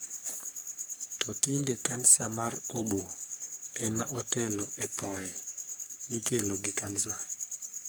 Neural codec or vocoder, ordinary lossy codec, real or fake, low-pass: codec, 44.1 kHz, 3.4 kbps, Pupu-Codec; none; fake; none